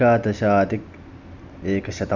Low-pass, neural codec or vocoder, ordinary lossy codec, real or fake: 7.2 kHz; none; none; real